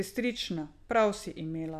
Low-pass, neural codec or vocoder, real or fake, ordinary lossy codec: 14.4 kHz; none; real; none